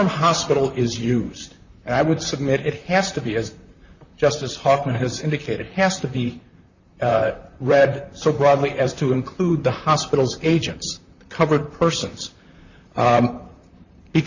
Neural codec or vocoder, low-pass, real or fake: vocoder, 44.1 kHz, 128 mel bands, Pupu-Vocoder; 7.2 kHz; fake